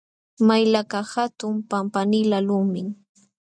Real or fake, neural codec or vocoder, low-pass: real; none; 9.9 kHz